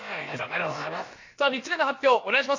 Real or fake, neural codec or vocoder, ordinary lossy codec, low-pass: fake; codec, 16 kHz, about 1 kbps, DyCAST, with the encoder's durations; MP3, 48 kbps; 7.2 kHz